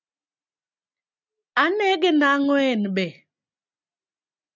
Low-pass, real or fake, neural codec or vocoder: 7.2 kHz; real; none